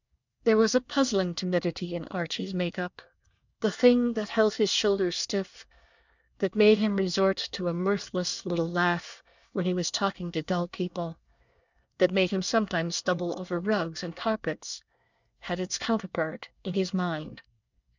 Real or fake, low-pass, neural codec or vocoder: fake; 7.2 kHz; codec, 24 kHz, 1 kbps, SNAC